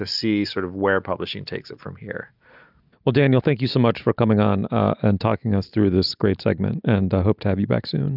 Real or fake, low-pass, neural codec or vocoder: real; 5.4 kHz; none